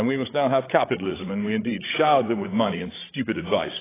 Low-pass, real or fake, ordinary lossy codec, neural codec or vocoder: 3.6 kHz; fake; AAC, 16 kbps; codec, 16 kHz, 16 kbps, FreqCodec, larger model